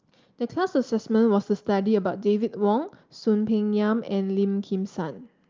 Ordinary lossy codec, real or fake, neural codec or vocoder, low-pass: Opus, 24 kbps; real; none; 7.2 kHz